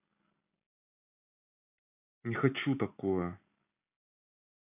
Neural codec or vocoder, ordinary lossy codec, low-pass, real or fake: none; none; 3.6 kHz; real